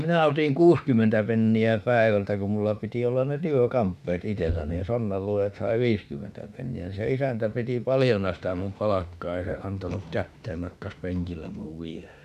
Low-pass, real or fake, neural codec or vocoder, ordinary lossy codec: 14.4 kHz; fake; autoencoder, 48 kHz, 32 numbers a frame, DAC-VAE, trained on Japanese speech; none